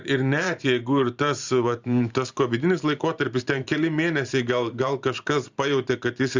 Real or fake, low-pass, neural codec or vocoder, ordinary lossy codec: fake; 7.2 kHz; vocoder, 44.1 kHz, 128 mel bands every 512 samples, BigVGAN v2; Opus, 64 kbps